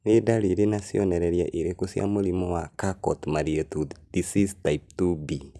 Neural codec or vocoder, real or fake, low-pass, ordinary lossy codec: none; real; none; none